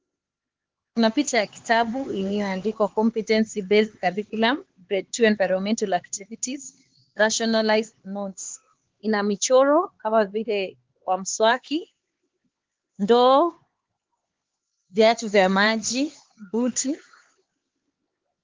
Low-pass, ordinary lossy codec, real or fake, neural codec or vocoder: 7.2 kHz; Opus, 16 kbps; fake; codec, 16 kHz, 4 kbps, X-Codec, HuBERT features, trained on LibriSpeech